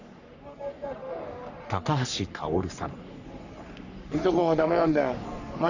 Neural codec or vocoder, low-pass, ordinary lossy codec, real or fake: codec, 44.1 kHz, 3.4 kbps, Pupu-Codec; 7.2 kHz; none; fake